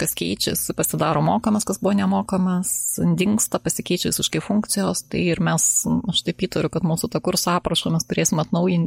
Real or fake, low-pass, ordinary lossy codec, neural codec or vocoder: real; 19.8 kHz; MP3, 64 kbps; none